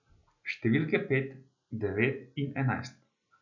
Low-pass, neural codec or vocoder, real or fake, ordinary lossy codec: 7.2 kHz; none; real; none